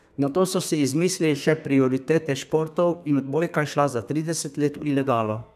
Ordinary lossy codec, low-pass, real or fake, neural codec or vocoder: none; 14.4 kHz; fake; codec, 32 kHz, 1.9 kbps, SNAC